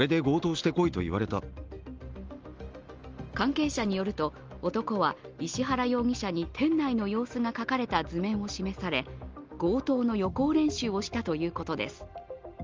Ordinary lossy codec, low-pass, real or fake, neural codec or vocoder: Opus, 24 kbps; 7.2 kHz; real; none